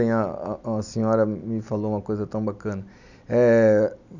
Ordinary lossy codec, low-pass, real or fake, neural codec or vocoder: none; 7.2 kHz; real; none